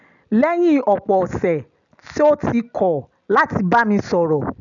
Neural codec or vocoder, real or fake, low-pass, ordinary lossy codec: none; real; 7.2 kHz; none